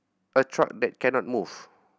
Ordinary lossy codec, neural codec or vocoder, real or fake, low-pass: none; none; real; none